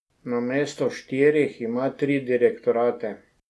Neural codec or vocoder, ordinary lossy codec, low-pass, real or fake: none; none; none; real